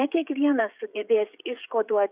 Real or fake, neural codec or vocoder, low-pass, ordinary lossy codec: fake; codec, 16 kHz, 16 kbps, FreqCodec, smaller model; 3.6 kHz; Opus, 64 kbps